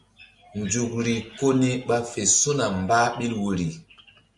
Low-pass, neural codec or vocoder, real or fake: 10.8 kHz; none; real